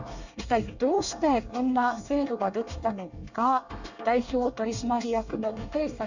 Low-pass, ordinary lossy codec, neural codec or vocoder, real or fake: 7.2 kHz; none; codec, 24 kHz, 1 kbps, SNAC; fake